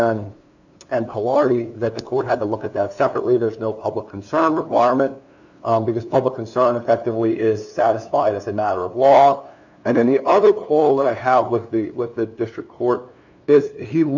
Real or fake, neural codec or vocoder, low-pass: fake; codec, 16 kHz, 2 kbps, FunCodec, trained on LibriTTS, 25 frames a second; 7.2 kHz